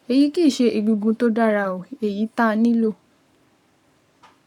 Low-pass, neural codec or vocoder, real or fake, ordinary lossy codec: 19.8 kHz; codec, 44.1 kHz, 7.8 kbps, Pupu-Codec; fake; none